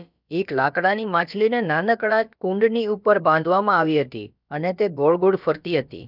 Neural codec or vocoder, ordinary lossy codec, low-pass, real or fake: codec, 16 kHz, about 1 kbps, DyCAST, with the encoder's durations; none; 5.4 kHz; fake